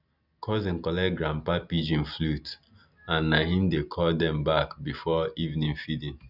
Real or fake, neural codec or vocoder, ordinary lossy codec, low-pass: real; none; none; 5.4 kHz